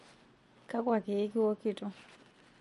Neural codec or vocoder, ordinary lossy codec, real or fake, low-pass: none; MP3, 48 kbps; real; 14.4 kHz